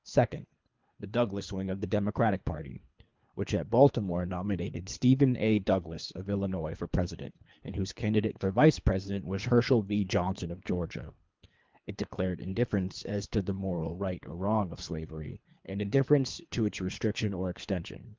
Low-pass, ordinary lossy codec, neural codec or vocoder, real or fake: 7.2 kHz; Opus, 24 kbps; codec, 24 kHz, 3 kbps, HILCodec; fake